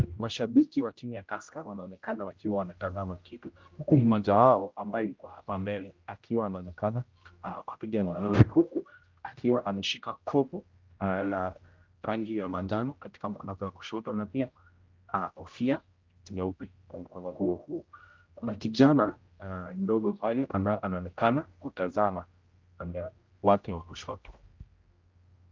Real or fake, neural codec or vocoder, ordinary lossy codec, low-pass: fake; codec, 16 kHz, 0.5 kbps, X-Codec, HuBERT features, trained on general audio; Opus, 32 kbps; 7.2 kHz